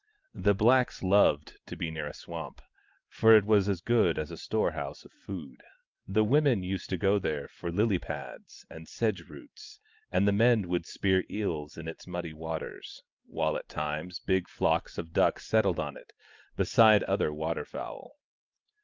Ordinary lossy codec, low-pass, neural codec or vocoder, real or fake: Opus, 32 kbps; 7.2 kHz; none; real